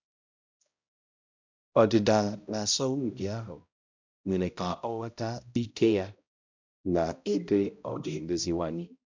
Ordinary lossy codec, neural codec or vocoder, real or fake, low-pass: MP3, 64 kbps; codec, 16 kHz, 0.5 kbps, X-Codec, HuBERT features, trained on balanced general audio; fake; 7.2 kHz